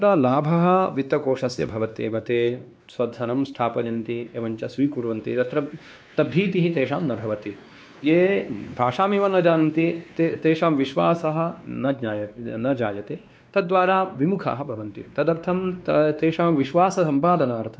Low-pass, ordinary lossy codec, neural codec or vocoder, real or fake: none; none; codec, 16 kHz, 2 kbps, X-Codec, WavLM features, trained on Multilingual LibriSpeech; fake